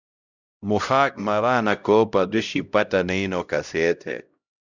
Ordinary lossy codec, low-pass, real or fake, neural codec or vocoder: Opus, 64 kbps; 7.2 kHz; fake; codec, 16 kHz, 0.5 kbps, X-Codec, HuBERT features, trained on LibriSpeech